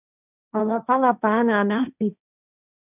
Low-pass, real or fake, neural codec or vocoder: 3.6 kHz; fake; codec, 16 kHz, 1.1 kbps, Voila-Tokenizer